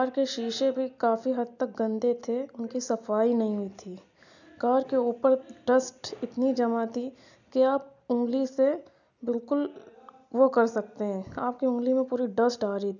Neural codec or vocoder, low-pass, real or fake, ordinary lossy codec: none; 7.2 kHz; real; none